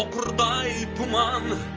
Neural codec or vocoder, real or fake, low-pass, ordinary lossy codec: none; real; 7.2 kHz; Opus, 24 kbps